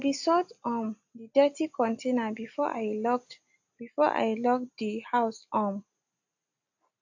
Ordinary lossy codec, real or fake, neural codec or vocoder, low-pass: AAC, 48 kbps; real; none; 7.2 kHz